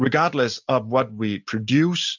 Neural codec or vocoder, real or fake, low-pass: none; real; 7.2 kHz